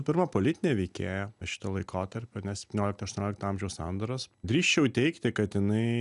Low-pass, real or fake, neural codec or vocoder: 10.8 kHz; real; none